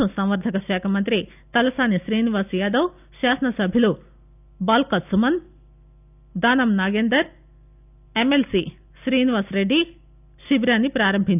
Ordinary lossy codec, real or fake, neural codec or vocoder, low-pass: none; real; none; 3.6 kHz